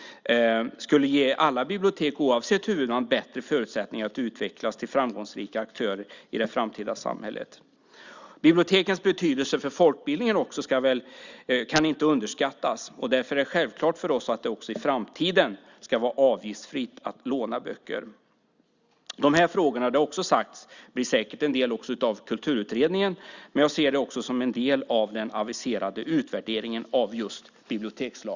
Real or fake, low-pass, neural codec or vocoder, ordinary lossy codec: real; 7.2 kHz; none; Opus, 64 kbps